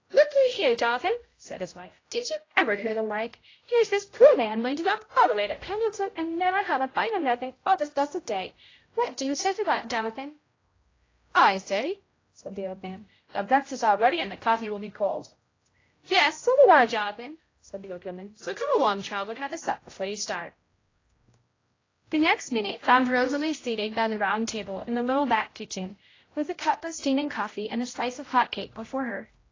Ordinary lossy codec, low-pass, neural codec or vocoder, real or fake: AAC, 32 kbps; 7.2 kHz; codec, 16 kHz, 0.5 kbps, X-Codec, HuBERT features, trained on general audio; fake